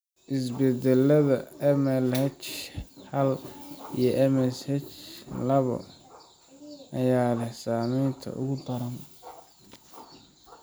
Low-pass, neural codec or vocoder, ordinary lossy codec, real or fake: none; none; none; real